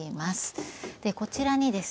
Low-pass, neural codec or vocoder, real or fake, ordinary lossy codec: none; none; real; none